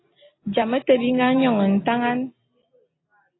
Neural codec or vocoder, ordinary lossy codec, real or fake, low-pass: none; AAC, 16 kbps; real; 7.2 kHz